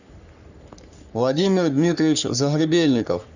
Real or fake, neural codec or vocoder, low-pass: fake; codec, 44.1 kHz, 3.4 kbps, Pupu-Codec; 7.2 kHz